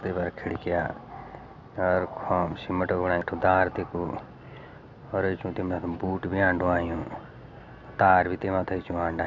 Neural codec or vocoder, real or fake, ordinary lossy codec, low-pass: none; real; none; 7.2 kHz